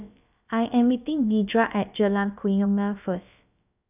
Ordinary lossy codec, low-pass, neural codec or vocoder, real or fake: none; 3.6 kHz; codec, 16 kHz, about 1 kbps, DyCAST, with the encoder's durations; fake